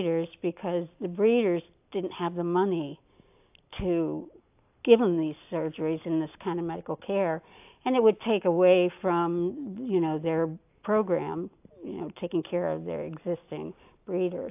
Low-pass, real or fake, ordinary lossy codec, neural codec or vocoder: 3.6 kHz; real; AAC, 32 kbps; none